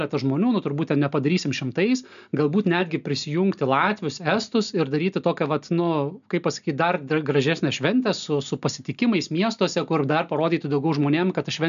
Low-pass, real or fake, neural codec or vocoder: 7.2 kHz; real; none